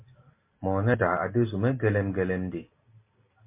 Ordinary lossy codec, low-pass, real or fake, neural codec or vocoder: MP3, 24 kbps; 3.6 kHz; real; none